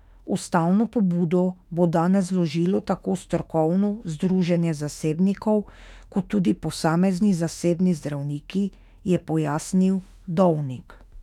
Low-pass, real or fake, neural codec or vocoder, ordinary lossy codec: 19.8 kHz; fake; autoencoder, 48 kHz, 32 numbers a frame, DAC-VAE, trained on Japanese speech; none